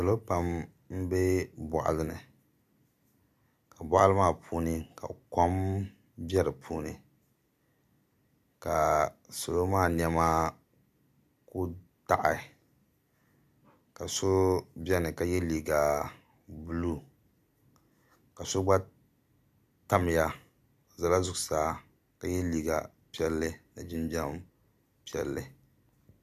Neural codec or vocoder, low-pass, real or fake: none; 14.4 kHz; real